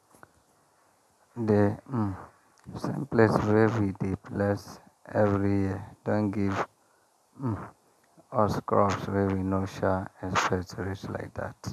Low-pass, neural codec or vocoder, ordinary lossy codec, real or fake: 14.4 kHz; none; none; real